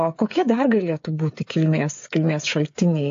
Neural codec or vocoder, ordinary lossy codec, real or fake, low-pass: codec, 16 kHz, 8 kbps, FreqCodec, smaller model; AAC, 48 kbps; fake; 7.2 kHz